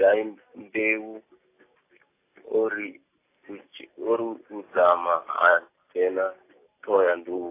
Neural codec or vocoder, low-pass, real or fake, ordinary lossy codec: none; 3.6 kHz; real; AAC, 24 kbps